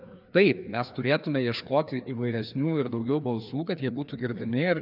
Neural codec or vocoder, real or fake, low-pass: codec, 16 kHz, 2 kbps, FreqCodec, larger model; fake; 5.4 kHz